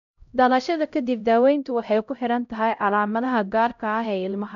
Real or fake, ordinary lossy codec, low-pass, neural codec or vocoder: fake; none; 7.2 kHz; codec, 16 kHz, 0.5 kbps, X-Codec, HuBERT features, trained on LibriSpeech